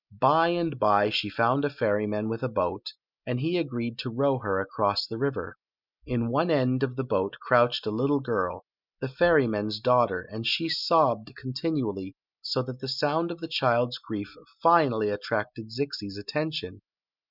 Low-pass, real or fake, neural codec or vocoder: 5.4 kHz; real; none